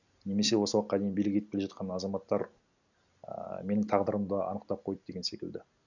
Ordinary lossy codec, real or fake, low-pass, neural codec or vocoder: none; real; 7.2 kHz; none